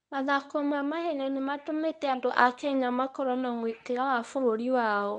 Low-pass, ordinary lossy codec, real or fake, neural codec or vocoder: 10.8 kHz; none; fake; codec, 24 kHz, 0.9 kbps, WavTokenizer, medium speech release version 1